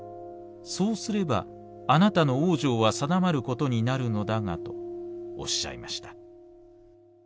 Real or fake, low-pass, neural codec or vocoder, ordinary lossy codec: real; none; none; none